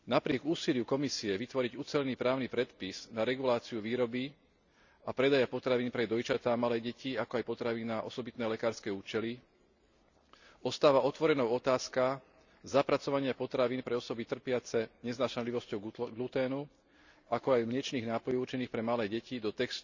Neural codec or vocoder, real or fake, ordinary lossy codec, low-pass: none; real; none; 7.2 kHz